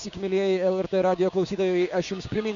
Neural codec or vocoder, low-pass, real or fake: codec, 16 kHz, 6 kbps, DAC; 7.2 kHz; fake